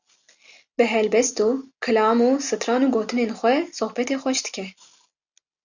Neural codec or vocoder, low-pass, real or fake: none; 7.2 kHz; real